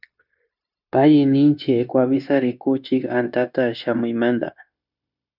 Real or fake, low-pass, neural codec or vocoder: fake; 5.4 kHz; codec, 16 kHz, 0.9 kbps, LongCat-Audio-Codec